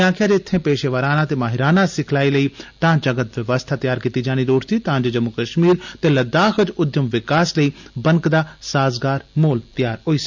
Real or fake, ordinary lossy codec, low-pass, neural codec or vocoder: real; none; 7.2 kHz; none